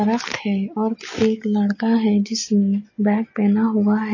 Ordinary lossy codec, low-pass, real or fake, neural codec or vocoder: MP3, 32 kbps; 7.2 kHz; real; none